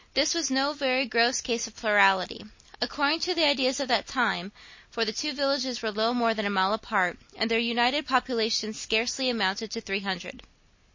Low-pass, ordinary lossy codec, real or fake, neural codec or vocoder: 7.2 kHz; MP3, 32 kbps; real; none